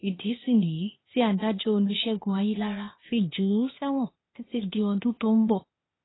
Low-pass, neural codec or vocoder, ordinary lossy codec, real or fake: 7.2 kHz; codec, 16 kHz, 0.8 kbps, ZipCodec; AAC, 16 kbps; fake